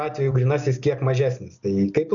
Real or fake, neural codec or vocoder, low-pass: real; none; 7.2 kHz